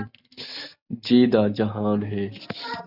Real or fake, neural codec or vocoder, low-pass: real; none; 5.4 kHz